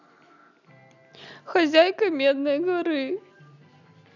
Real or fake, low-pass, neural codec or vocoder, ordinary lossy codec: real; 7.2 kHz; none; none